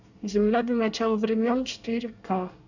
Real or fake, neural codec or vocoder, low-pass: fake; codec, 24 kHz, 1 kbps, SNAC; 7.2 kHz